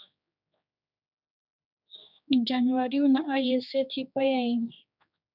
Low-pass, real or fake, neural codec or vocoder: 5.4 kHz; fake; codec, 16 kHz, 2 kbps, X-Codec, HuBERT features, trained on general audio